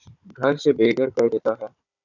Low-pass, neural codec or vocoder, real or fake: 7.2 kHz; codec, 16 kHz, 16 kbps, FunCodec, trained on Chinese and English, 50 frames a second; fake